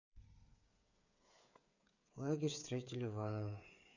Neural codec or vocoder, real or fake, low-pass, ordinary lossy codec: codec, 16 kHz, 8 kbps, FreqCodec, smaller model; fake; 7.2 kHz; none